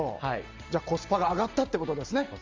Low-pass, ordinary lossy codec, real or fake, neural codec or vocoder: 7.2 kHz; Opus, 32 kbps; real; none